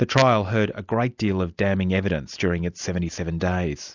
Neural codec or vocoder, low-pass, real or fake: none; 7.2 kHz; real